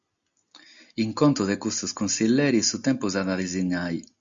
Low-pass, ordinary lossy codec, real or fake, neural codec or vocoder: 7.2 kHz; Opus, 64 kbps; real; none